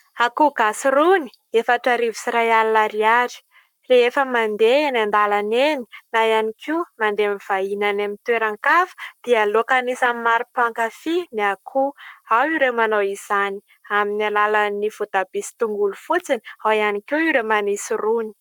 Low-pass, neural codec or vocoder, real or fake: 19.8 kHz; codec, 44.1 kHz, 7.8 kbps, Pupu-Codec; fake